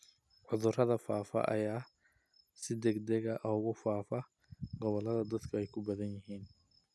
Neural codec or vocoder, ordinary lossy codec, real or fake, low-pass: none; none; real; none